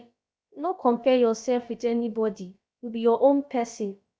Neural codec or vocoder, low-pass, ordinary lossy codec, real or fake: codec, 16 kHz, about 1 kbps, DyCAST, with the encoder's durations; none; none; fake